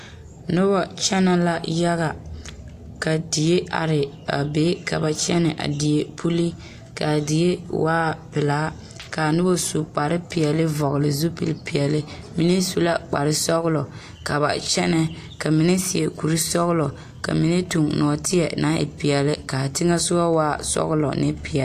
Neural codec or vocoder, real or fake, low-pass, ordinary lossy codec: none; real; 14.4 kHz; AAC, 64 kbps